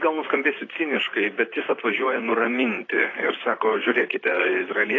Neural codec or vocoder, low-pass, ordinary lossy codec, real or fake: vocoder, 44.1 kHz, 128 mel bands, Pupu-Vocoder; 7.2 kHz; AAC, 32 kbps; fake